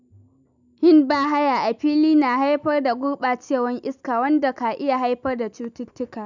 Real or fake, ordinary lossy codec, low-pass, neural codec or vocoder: real; none; 7.2 kHz; none